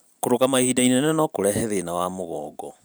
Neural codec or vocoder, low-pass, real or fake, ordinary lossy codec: vocoder, 44.1 kHz, 128 mel bands every 512 samples, BigVGAN v2; none; fake; none